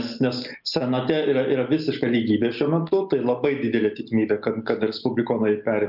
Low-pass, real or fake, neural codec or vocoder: 5.4 kHz; real; none